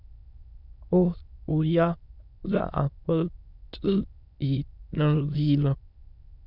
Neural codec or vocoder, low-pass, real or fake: autoencoder, 22.05 kHz, a latent of 192 numbers a frame, VITS, trained on many speakers; 5.4 kHz; fake